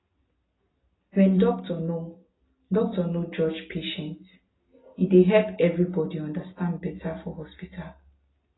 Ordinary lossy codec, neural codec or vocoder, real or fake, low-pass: AAC, 16 kbps; none; real; 7.2 kHz